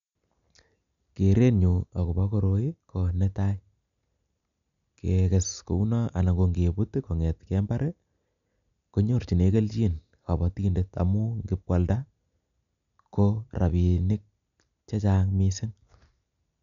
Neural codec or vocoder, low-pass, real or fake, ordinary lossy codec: none; 7.2 kHz; real; none